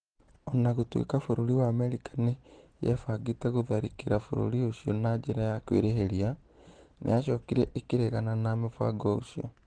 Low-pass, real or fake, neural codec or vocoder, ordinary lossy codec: 9.9 kHz; real; none; Opus, 16 kbps